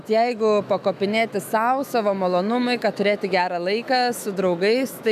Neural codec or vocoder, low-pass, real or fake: autoencoder, 48 kHz, 128 numbers a frame, DAC-VAE, trained on Japanese speech; 14.4 kHz; fake